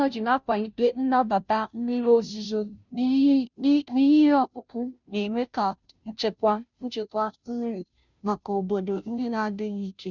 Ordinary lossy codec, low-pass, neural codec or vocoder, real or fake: none; 7.2 kHz; codec, 16 kHz, 0.5 kbps, FunCodec, trained on Chinese and English, 25 frames a second; fake